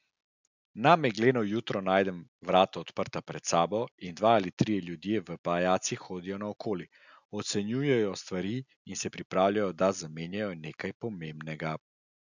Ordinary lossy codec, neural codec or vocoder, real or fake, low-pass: none; none; real; 7.2 kHz